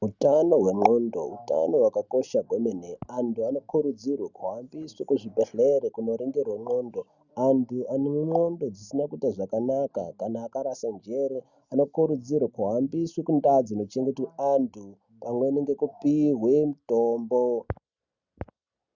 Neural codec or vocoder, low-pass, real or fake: none; 7.2 kHz; real